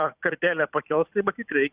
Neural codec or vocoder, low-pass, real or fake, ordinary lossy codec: vocoder, 44.1 kHz, 128 mel bands every 256 samples, BigVGAN v2; 3.6 kHz; fake; Opus, 64 kbps